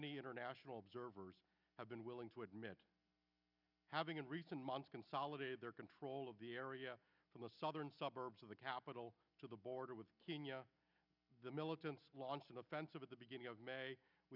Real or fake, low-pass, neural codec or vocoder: real; 5.4 kHz; none